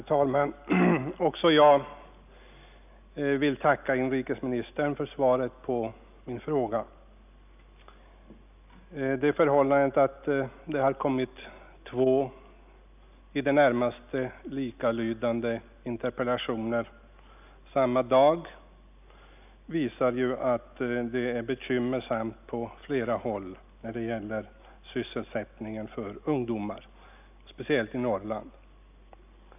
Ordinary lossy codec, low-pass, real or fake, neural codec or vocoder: none; 3.6 kHz; real; none